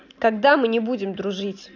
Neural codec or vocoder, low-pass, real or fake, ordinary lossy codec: none; 7.2 kHz; real; none